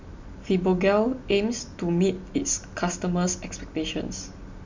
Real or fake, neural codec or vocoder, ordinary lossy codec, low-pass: real; none; MP3, 64 kbps; 7.2 kHz